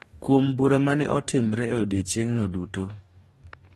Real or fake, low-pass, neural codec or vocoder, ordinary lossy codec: fake; 19.8 kHz; codec, 44.1 kHz, 2.6 kbps, DAC; AAC, 32 kbps